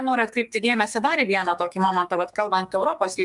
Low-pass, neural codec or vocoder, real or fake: 10.8 kHz; codec, 32 kHz, 1.9 kbps, SNAC; fake